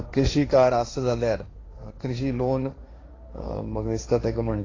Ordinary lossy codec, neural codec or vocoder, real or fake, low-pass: AAC, 32 kbps; codec, 16 kHz, 1.1 kbps, Voila-Tokenizer; fake; 7.2 kHz